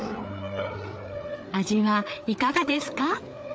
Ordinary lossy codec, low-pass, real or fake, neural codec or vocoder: none; none; fake; codec, 16 kHz, 4 kbps, FreqCodec, larger model